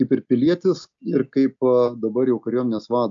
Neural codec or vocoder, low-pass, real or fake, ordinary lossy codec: none; 7.2 kHz; real; AAC, 64 kbps